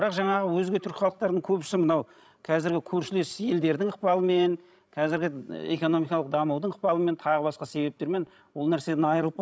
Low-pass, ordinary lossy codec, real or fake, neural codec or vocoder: none; none; fake; codec, 16 kHz, 16 kbps, FreqCodec, larger model